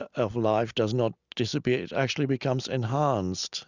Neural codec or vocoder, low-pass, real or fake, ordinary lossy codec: none; 7.2 kHz; real; Opus, 64 kbps